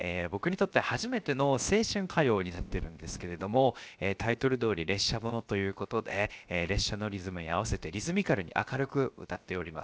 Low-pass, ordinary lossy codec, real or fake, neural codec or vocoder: none; none; fake; codec, 16 kHz, 0.7 kbps, FocalCodec